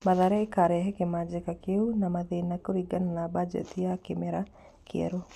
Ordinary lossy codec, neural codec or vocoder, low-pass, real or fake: none; none; 14.4 kHz; real